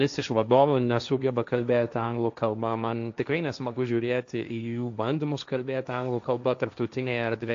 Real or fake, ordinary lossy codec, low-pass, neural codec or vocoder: fake; AAC, 64 kbps; 7.2 kHz; codec, 16 kHz, 1.1 kbps, Voila-Tokenizer